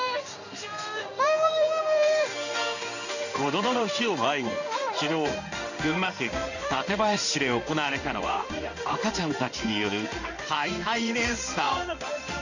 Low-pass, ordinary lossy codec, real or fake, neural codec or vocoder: 7.2 kHz; none; fake; codec, 16 kHz in and 24 kHz out, 1 kbps, XY-Tokenizer